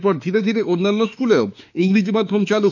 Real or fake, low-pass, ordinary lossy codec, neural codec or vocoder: fake; 7.2 kHz; none; autoencoder, 48 kHz, 32 numbers a frame, DAC-VAE, trained on Japanese speech